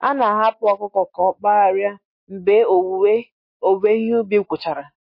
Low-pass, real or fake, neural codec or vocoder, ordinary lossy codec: 5.4 kHz; real; none; MP3, 32 kbps